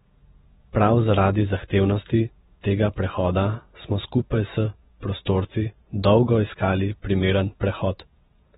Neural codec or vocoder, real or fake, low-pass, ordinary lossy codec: vocoder, 48 kHz, 128 mel bands, Vocos; fake; 19.8 kHz; AAC, 16 kbps